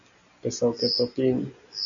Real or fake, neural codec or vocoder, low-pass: real; none; 7.2 kHz